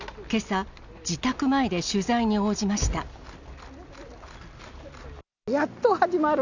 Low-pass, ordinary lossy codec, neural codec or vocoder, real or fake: 7.2 kHz; none; none; real